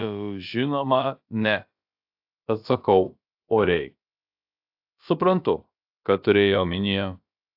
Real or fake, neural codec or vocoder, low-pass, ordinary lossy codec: fake; codec, 16 kHz, about 1 kbps, DyCAST, with the encoder's durations; 5.4 kHz; AAC, 48 kbps